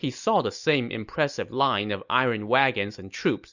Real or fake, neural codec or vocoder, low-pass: real; none; 7.2 kHz